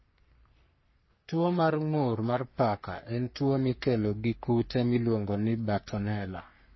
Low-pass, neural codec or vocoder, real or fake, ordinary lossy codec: 7.2 kHz; codec, 44.1 kHz, 3.4 kbps, Pupu-Codec; fake; MP3, 24 kbps